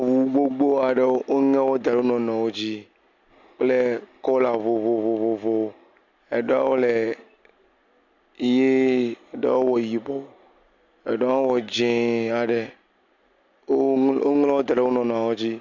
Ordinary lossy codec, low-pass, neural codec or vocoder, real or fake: MP3, 64 kbps; 7.2 kHz; none; real